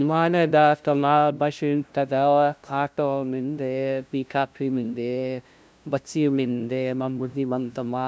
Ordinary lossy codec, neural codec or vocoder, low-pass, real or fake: none; codec, 16 kHz, 0.5 kbps, FunCodec, trained on LibriTTS, 25 frames a second; none; fake